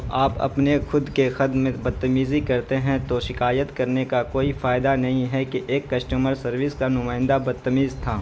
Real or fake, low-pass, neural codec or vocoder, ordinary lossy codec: real; none; none; none